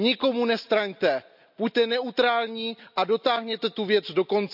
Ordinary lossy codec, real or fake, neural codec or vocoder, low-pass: none; real; none; 5.4 kHz